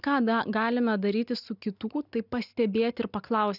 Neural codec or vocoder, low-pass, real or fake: none; 5.4 kHz; real